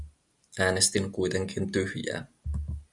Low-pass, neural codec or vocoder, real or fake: 10.8 kHz; none; real